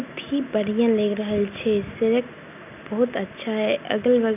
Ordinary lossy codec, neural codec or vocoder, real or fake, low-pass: none; none; real; 3.6 kHz